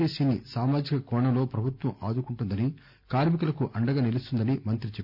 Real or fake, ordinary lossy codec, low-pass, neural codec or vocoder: real; none; 5.4 kHz; none